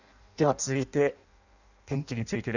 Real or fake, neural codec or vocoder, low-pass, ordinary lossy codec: fake; codec, 16 kHz in and 24 kHz out, 0.6 kbps, FireRedTTS-2 codec; 7.2 kHz; none